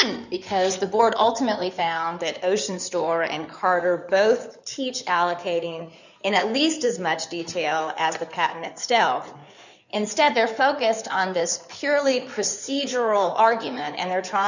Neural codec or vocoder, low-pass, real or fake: codec, 16 kHz in and 24 kHz out, 2.2 kbps, FireRedTTS-2 codec; 7.2 kHz; fake